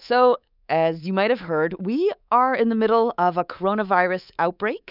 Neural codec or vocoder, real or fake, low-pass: codec, 24 kHz, 3.1 kbps, DualCodec; fake; 5.4 kHz